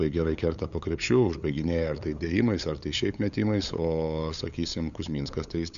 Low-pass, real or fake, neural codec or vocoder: 7.2 kHz; fake; codec, 16 kHz, 8 kbps, FunCodec, trained on LibriTTS, 25 frames a second